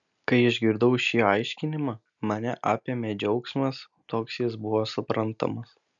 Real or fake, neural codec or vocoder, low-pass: real; none; 7.2 kHz